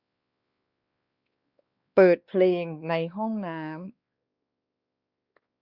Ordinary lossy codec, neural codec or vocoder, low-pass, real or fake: Opus, 64 kbps; codec, 16 kHz, 2 kbps, X-Codec, WavLM features, trained on Multilingual LibriSpeech; 5.4 kHz; fake